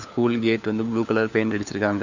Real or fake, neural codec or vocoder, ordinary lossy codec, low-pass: fake; codec, 16 kHz, 4 kbps, FunCodec, trained on LibriTTS, 50 frames a second; none; 7.2 kHz